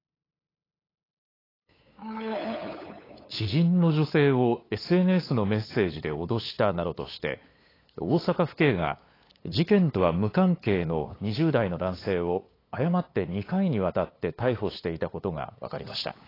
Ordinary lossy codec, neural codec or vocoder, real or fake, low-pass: AAC, 24 kbps; codec, 16 kHz, 8 kbps, FunCodec, trained on LibriTTS, 25 frames a second; fake; 5.4 kHz